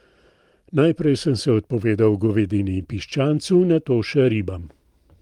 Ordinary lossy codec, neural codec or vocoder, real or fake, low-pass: Opus, 24 kbps; vocoder, 44.1 kHz, 128 mel bands every 512 samples, BigVGAN v2; fake; 19.8 kHz